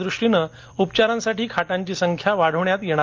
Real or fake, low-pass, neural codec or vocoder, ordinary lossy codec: real; 7.2 kHz; none; Opus, 32 kbps